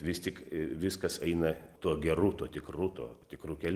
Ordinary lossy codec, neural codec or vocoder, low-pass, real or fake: Opus, 24 kbps; none; 14.4 kHz; real